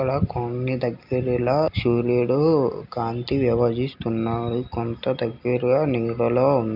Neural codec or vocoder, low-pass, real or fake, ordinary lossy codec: none; 5.4 kHz; real; none